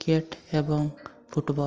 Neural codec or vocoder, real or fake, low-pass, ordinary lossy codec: none; real; 7.2 kHz; Opus, 24 kbps